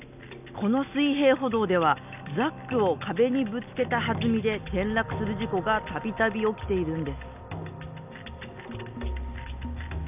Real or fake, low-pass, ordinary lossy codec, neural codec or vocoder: real; 3.6 kHz; none; none